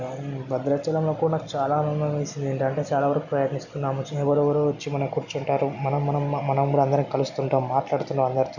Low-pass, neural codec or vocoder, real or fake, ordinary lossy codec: 7.2 kHz; none; real; none